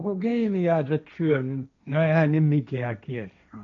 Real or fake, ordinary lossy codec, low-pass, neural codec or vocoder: fake; none; 7.2 kHz; codec, 16 kHz, 1.1 kbps, Voila-Tokenizer